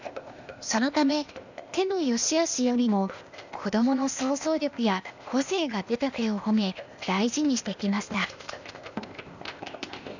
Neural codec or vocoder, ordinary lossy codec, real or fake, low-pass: codec, 16 kHz, 0.8 kbps, ZipCodec; none; fake; 7.2 kHz